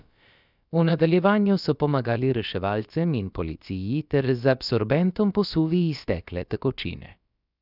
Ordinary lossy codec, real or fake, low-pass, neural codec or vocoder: none; fake; 5.4 kHz; codec, 16 kHz, about 1 kbps, DyCAST, with the encoder's durations